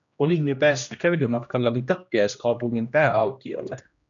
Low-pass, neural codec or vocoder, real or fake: 7.2 kHz; codec, 16 kHz, 1 kbps, X-Codec, HuBERT features, trained on general audio; fake